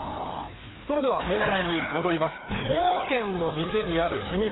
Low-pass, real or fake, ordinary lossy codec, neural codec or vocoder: 7.2 kHz; fake; AAC, 16 kbps; codec, 16 kHz, 2 kbps, FreqCodec, larger model